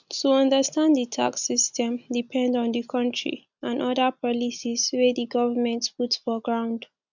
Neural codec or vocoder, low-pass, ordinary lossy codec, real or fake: none; 7.2 kHz; none; real